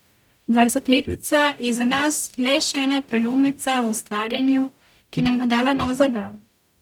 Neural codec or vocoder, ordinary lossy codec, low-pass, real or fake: codec, 44.1 kHz, 0.9 kbps, DAC; none; 19.8 kHz; fake